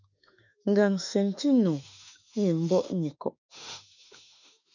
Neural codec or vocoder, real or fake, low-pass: autoencoder, 48 kHz, 32 numbers a frame, DAC-VAE, trained on Japanese speech; fake; 7.2 kHz